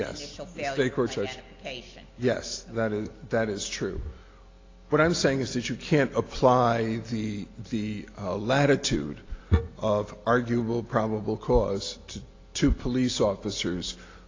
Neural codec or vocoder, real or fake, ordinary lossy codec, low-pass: none; real; AAC, 32 kbps; 7.2 kHz